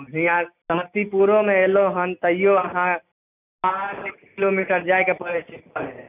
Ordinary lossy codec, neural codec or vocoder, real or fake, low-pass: none; none; real; 3.6 kHz